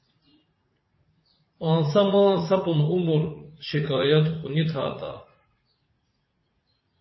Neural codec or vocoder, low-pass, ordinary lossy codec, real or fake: vocoder, 44.1 kHz, 80 mel bands, Vocos; 7.2 kHz; MP3, 24 kbps; fake